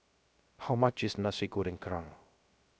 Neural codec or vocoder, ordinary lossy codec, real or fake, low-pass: codec, 16 kHz, 0.3 kbps, FocalCodec; none; fake; none